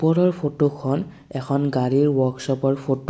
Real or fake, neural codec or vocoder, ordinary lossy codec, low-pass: real; none; none; none